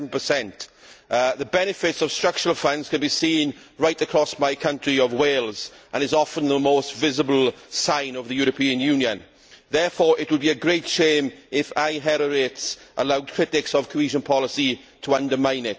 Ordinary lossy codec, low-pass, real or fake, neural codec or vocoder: none; none; real; none